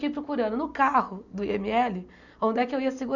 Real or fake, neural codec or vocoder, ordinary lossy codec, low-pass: real; none; none; 7.2 kHz